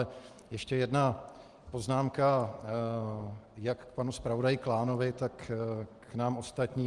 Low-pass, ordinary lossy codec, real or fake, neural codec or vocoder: 10.8 kHz; Opus, 24 kbps; real; none